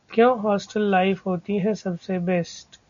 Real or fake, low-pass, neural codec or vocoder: real; 7.2 kHz; none